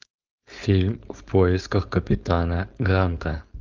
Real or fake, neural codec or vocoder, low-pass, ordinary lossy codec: fake; codec, 16 kHz, 4.8 kbps, FACodec; 7.2 kHz; Opus, 32 kbps